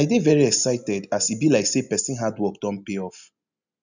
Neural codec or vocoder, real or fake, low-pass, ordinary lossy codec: none; real; 7.2 kHz; none